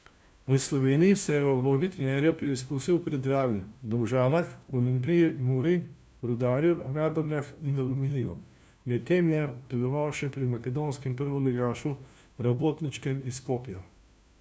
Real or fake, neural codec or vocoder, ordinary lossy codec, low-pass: fake; codec, 16 kHz, 1 kbps, FunCodec, trained on LibriTTS, 50 frames a second; none; none